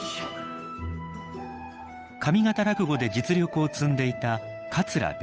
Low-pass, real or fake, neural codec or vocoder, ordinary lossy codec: none; fake; codec, 16 kHz, 8 kbps, FunCodec, trained on Chinese and English, 25 frames a second; none